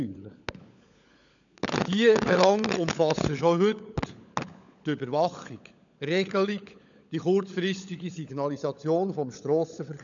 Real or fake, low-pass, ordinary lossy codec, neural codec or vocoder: fake; 7.2 kHz; none; codec, 16 kHz, 16 kbps, FunCodec, trained on LibriTTS, 50 frames a second